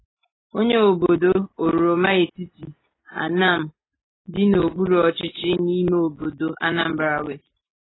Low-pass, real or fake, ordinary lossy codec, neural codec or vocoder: 7.2 kHz; real; AAC, 16 kbps; none